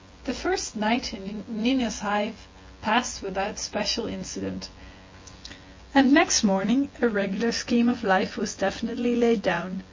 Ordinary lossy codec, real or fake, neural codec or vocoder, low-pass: MP3, 32 kbps; fake; vocoder, 24 kHz, 100 mel bands, Vocos; 7.2 kHz